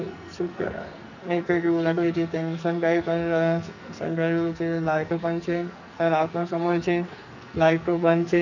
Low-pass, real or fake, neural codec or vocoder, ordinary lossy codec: 7.2 kHz; fake; codec, 44.1 kHz, 2.6 kbps, SNAC; none